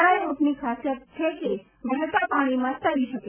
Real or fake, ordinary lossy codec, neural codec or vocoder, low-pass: real; none; none; 3.6 kHz